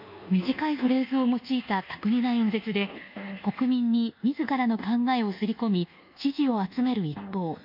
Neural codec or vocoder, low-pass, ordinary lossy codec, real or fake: codec, 24 kHz, 1.2 kbps, DualCodec; 5.4 kHz; MP3, 48 kbps; fake